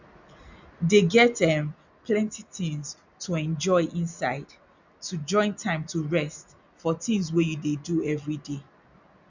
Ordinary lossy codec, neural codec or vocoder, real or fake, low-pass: none; none; real; 7.2 kHz